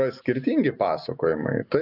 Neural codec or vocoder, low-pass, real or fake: none; 5.4 kHz; real